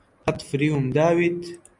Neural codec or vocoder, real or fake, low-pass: none; real; 10.8 kHz